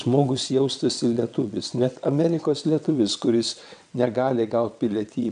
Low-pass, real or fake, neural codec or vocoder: 9.9 kHz; fake; vocoder, 22.05 kHz, 80 mel bands, WaveNeXt